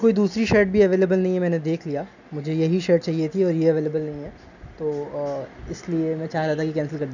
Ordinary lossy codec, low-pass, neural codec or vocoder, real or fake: none; 7.2 kHz; none; real